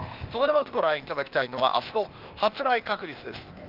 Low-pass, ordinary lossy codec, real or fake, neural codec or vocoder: 5.4 kHz; Opus, 24 kbps; fake; codec, 16 kHz, 0.8 kbps, ZipCodec